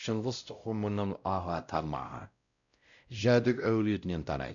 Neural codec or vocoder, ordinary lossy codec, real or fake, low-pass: codec, 16 kHz, 0.5 kbps, X-Codec, WavLM features, trained on Multilingual LibriSpeech; none; fake; 7.2 kHz